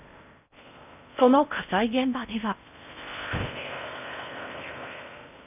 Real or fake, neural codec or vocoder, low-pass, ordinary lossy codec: fake; codec, 16 kHz in and 24 kHz out, 0.6 kbps, FocalCodec, streaming, 4096 codes; 3.6 kHz; none